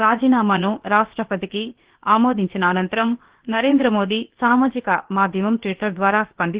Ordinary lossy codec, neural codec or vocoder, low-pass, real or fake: Opus, 16 kbps; codec, 16 kHz, about 1 kbps, DyCAST, with the encoder's durations; 3.6 kHz; fake